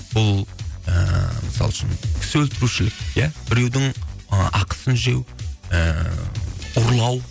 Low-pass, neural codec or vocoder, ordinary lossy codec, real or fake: none; none; none; real